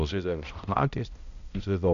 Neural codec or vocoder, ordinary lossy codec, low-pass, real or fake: codec, 16 kHz, 0.5 kbps, X-Codec, HuBERT features, trained on balanced general audio; AAC, 96 kbps; 7.2 kHz; fake